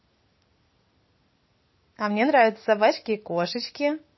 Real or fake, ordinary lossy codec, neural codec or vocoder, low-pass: real; MP3, 24 kbps; none; 7.2 kHz